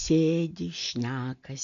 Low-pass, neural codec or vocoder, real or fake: 7.2 kHz; none; real